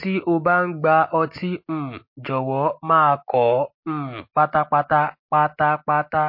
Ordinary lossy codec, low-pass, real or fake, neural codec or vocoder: MP3, 32 kbps; 5.4 kHz; real; none